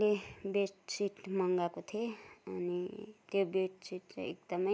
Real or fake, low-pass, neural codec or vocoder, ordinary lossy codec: real; none; none; none